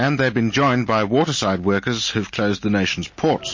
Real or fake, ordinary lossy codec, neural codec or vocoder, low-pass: real; MP3, 32 kbps; none; 7.2 kHz